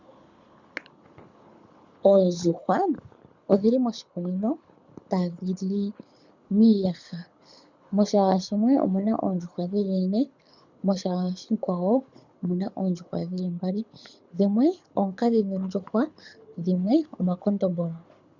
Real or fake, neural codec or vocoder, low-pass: fake; codec, 24 kHz, 6 kbps, HILCodec; 7.2 kHz